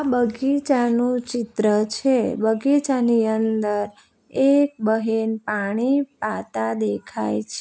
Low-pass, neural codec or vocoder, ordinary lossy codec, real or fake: none; none; none; real